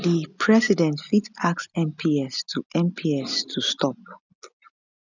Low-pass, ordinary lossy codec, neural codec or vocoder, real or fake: 7.2 kHz; none; none; real